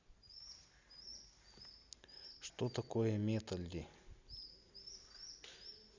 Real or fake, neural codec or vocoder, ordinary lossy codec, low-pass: real; none; Opus, 64 kbps; 7.2 kHz